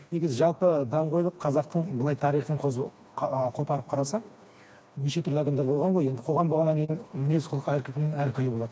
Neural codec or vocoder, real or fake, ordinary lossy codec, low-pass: codec, 16 kHz, 2 kbps, FreqCodec, smaller model; fake; none; none